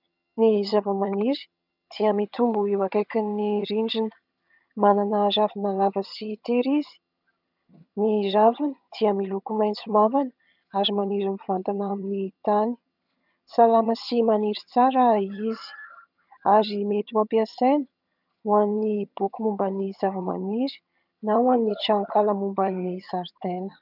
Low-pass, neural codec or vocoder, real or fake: 5.4 kHz; vocoder, 22.05 kHz, 80 mel bands, HiFi-GAN; fake